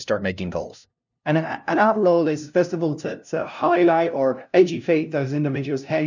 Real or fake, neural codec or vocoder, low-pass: fake; codec, 16 kHz, 0.5 kbps, FunCodec, trained on LibriTTS, 25 frames a second; 7.2 kHz